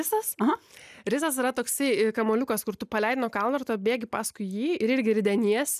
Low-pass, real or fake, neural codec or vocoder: 14.4 kHz; real; none